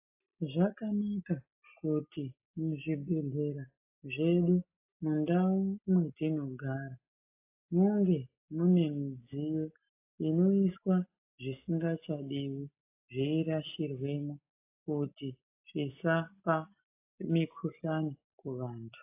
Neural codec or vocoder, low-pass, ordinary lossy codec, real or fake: none; 3.6 kHz; AAC, 32 kbps; real